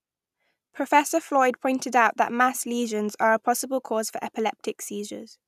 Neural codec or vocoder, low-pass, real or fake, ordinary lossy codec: none; 14.4 kHz; real; none